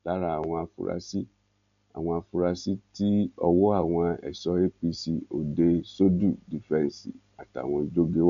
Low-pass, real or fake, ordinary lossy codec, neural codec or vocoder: 7.2 kHz; real; none; none